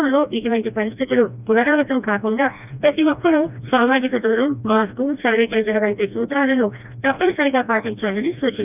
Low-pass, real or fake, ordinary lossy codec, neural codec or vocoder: 3.6 kHz; fake; none; codec, 16 kHz, 1 kbps, FreqCodec, smaller model